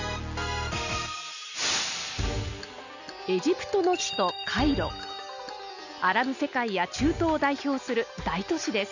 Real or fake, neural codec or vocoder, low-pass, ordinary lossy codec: real; none; 7.2 kHz; none